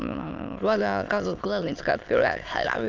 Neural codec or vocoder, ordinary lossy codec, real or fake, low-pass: autoencoder, 22.05 kHz, a latent of 192 numbers a frame, VITS, trained on many speakers; Opus, 32 kbps; fake; 7.2 kHz